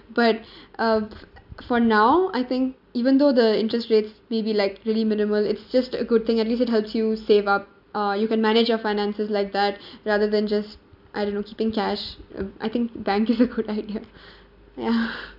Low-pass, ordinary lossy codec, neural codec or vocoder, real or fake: 5.4 kHz; none; none; real